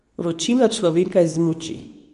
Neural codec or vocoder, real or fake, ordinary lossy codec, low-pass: codec, 24 kHz, 0.9 kbps, WavTokenizer, medium speech release version 2; fake; none; 10.8 kHz